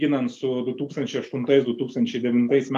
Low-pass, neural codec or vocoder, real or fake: 14.4 kHz; none; real